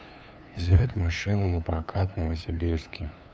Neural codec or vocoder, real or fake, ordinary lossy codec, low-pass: codec, 16 kHz, 2 kbps, FunCodec, trained on LibriTTS, 25 frames a second; fake; none; none